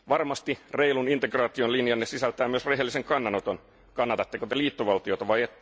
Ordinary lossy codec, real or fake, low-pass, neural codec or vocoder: none; real; none; none